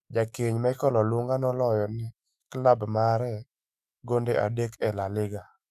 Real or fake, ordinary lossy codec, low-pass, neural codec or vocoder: fake; none; 14.4 kHz; autoencoder, 48 kHz, 128 numbers a frame, DAC-VAE, trained on Japanese speech